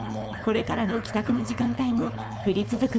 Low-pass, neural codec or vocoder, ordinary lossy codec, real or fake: none; codec, 16 kHz, 4.8 kbps, FACodec; none; fake